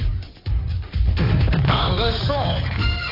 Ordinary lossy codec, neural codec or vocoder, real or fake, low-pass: AAC, 32 kbps; codec, 16 kHz in and 24 kHz out, 2.2 kbps, FireRedTTS-2 codec; fake; 5.4 kHz